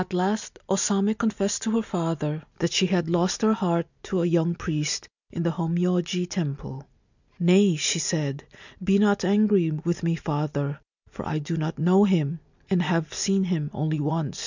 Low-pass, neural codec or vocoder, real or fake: 7.2 kHz; none; real